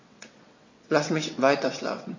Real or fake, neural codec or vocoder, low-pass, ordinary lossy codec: fake; vocoder, 22.05 kHz, 80 mel bands, WaveNeXt; 7.2 kHz; MP3, 32 kbps